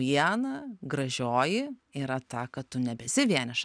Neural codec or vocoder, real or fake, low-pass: none; real; 9.9 kHz